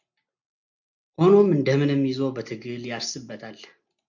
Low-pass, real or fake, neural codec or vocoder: 7.2 kHz; real; none